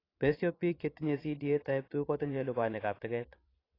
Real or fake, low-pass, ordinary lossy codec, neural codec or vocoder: fake; 5.4 kHz; AAC, 24 kbps; vocoder, 22.05 kHz, 80 mel bands, Vocos